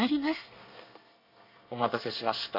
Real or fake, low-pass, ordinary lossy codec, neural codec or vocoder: fake; 5.4 kHz; none; codec, 24 kHz, 1 kbps, SNAC